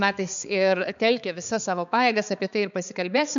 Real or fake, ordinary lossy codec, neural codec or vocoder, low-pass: fake; MP3, 96 kbps; codec, 16 kHz, 4 kbps, X-Codec, HuBERT features, trained on balanced general audio; 7.2 kHz